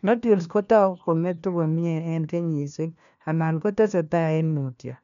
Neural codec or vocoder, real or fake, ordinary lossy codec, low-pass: codec, 16 kHz, 1 kbps, FunCodec, trained on LibriTTS, 50 frames a second; fake; none; 7.2 kHz